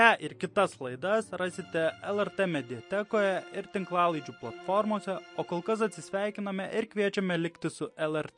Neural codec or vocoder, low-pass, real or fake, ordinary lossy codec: none; 10.8 kHz; real; MP3, 48 kbps